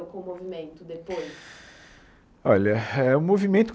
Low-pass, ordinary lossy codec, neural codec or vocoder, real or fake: none; none; none; real